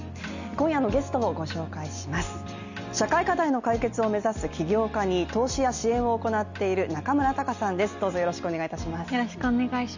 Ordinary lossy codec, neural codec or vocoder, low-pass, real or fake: none; none; 7.2 kHz; real